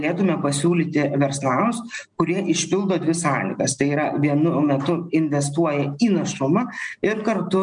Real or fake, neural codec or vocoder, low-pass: real; none; 9.9 kHz